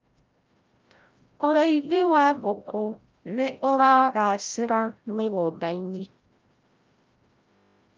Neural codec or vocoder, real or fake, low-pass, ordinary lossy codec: codec, 16 kHz, 0.5 kbps, FreqCodec, larger model; fake; 7.2 kHz; Opus, 32 kbps